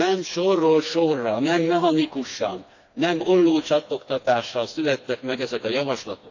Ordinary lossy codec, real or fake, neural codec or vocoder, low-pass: AAC, 48 kbps; fake; codec, 16 kHz, 2 kbps, FreqCodec, smaller model; 7.2 kHz